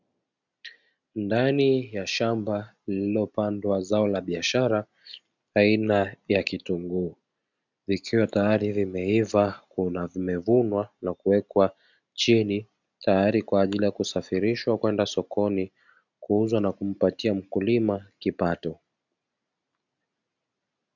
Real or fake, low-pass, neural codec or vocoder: real; 7.2 kHz; none